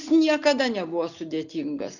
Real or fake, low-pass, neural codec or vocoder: real; 7.2 kHz; none